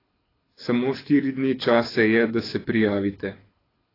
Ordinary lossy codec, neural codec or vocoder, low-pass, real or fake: AAC, 24 kbps; codec, 24 kHz, 6 kbps, HILCodec; 5.4 kHz; fake